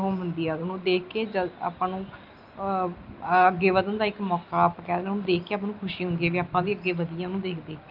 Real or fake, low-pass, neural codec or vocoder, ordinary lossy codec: real; 5.4 kHz; none; Opus, 24 kbps